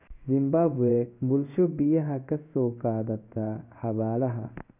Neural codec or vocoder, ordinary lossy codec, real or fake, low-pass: codec, 16 kHz in and 24 kHz out, 1 kbps, XY-Tokenizer; none; fake; 3.6 kHz